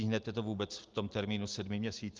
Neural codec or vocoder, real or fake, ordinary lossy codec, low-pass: none; real; Opus, 32 kbps; 7.2 kHz